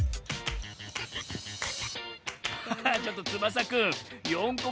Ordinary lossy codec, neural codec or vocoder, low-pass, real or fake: none; none; none; real